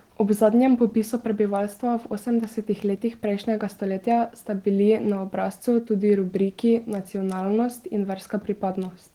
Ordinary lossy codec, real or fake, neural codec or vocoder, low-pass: Opus, 16 kbps; real; none; 19.8 kHz